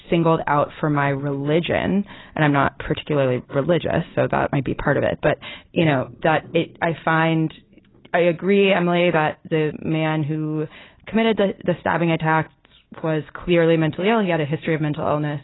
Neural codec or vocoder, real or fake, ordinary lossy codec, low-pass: none; real; AAC, 16 kbps; 7.2 kHz